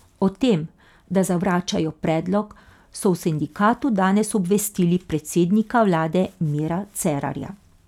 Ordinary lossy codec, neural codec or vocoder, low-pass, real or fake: none; none; 19.8 kHz; real